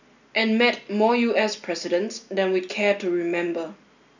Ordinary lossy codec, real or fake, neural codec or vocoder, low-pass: none; real; none; 7.2 kHz